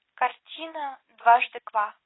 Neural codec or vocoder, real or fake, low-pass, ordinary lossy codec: none; real; 7.2 kHz; AAC, 16 kbps